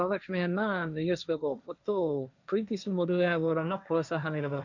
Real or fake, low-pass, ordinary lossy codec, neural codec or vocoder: fake; 7.2 kHz; none; codec, 16 kHz, 1.1 kbps, Voila-Tokenizer